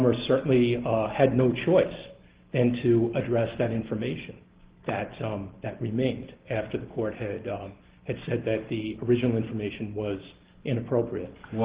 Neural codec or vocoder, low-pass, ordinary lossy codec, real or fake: none; 3.6 kHz; Opus, 16 kbps; real